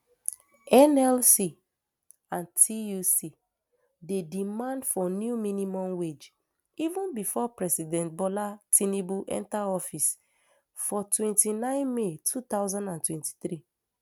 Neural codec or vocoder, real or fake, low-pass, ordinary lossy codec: none; real; none; none